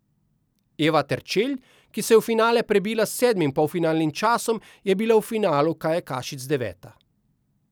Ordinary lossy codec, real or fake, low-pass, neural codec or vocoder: none; real; none; none